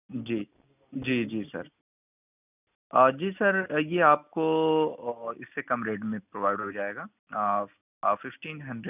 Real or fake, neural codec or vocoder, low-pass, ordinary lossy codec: real; none; 3.6 kHz; none